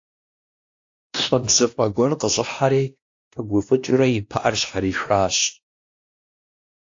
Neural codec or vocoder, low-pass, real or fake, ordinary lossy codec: codec, 16 kHz, 1 kbps, X-Codec, WavLM features, trained on Multilingual LibriSpeech; 7.2 kHz; fake; AAC, 48 kbps